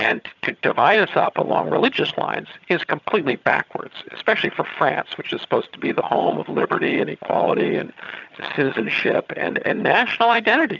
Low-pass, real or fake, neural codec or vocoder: 7.2 kHz; fake; vocoder, 22.05 kHz, 80 mel bands, HiFi-GAN